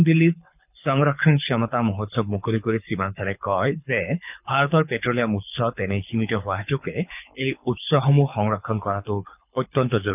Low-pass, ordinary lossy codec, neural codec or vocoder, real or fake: 3.6 kHz; none; codec, 24 kHz, 6 kbps, HILCodec; fake